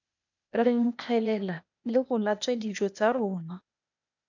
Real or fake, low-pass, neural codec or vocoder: fake; 7.2 kHz; codec, 16 kHz, 0.8 kbps, ZipCodec